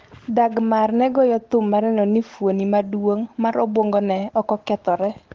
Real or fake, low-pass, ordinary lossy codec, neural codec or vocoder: real; 7.2 kHz; Opus, 16 kbps; none